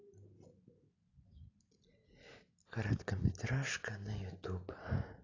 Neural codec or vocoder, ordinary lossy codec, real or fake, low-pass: none; AAC, 32 kbps; real; 7.2 kHz